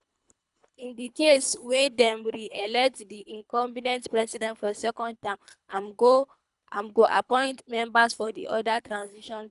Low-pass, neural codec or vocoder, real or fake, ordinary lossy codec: 10.8 kHz; codec, 24 kHz, 3 kbps, HILCodec; fake; none